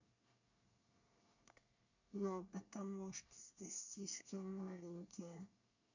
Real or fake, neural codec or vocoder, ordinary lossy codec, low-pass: fake; codec, 24 kHz, 1 kbps, SNAC; none; 7.2 kHz